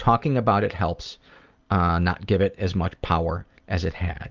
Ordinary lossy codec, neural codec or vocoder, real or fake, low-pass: Opus, 24 kbps; none; real; 7.2 kHz